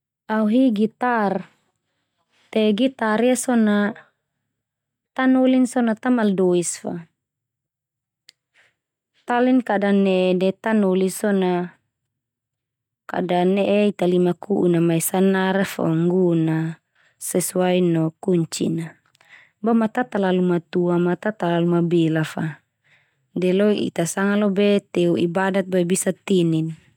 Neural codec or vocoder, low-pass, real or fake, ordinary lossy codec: none; 19.8 kHz; real; none